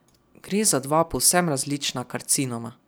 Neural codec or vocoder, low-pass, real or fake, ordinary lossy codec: none; none; real; none